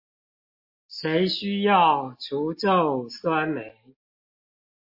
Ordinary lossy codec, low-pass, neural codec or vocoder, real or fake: MP3, 32 kbps; 5.4 kHz; none; real